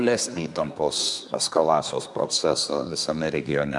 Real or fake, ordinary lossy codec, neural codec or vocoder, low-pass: fake; MP3, 96 kbps; codec, 24 kHz, 1 kbps, SNAC; 10.8 kHz